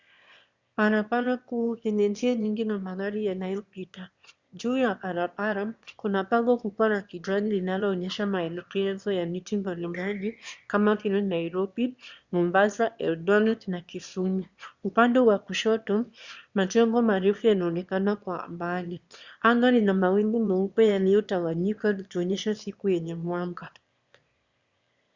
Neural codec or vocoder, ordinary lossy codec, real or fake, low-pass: autoencoder, 22.05 kHz, a latent of 192 numbers a frame, VITS, trained on one speaker; Opus, 64 kbps; fake; 7.2 kHz